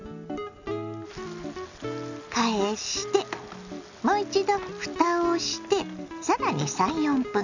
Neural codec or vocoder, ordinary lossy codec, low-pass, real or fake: none; none; 7.2 kHz; real